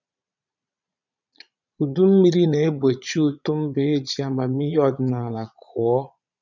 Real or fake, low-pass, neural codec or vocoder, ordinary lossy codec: fake; 7.2 kHz; vocoder, 22.05 kHz, 80 mel bands, Vocos; none